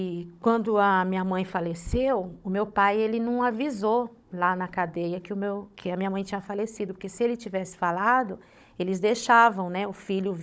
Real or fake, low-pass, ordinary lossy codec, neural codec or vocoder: fake; none; none; codec, 16 kHz, 16 kbps, FunCodec, trained on Chinese and English, 50 frames a second